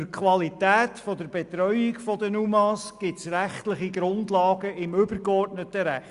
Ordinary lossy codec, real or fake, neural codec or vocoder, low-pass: Opus, 64 kbps; real; none; 10.8 kHz